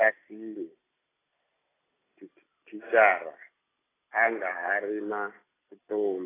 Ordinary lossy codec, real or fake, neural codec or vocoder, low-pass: AAC, 16 kbps; real; none; 3.6 kHz